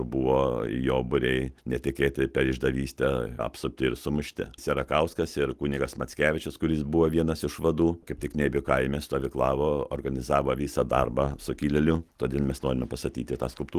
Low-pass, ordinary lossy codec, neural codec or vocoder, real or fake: 14.4 kHz; Opus, 24 kbps; none; real